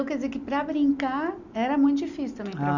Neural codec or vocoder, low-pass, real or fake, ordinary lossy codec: none; 7.2 kHz; real; none